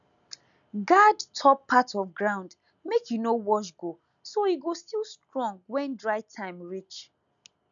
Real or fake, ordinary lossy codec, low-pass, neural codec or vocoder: real; none; 7.2 kHz; none